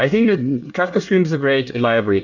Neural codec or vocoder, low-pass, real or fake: codec, 24 kHz, 1 kbps, SNAC; 7.2 kHz; fake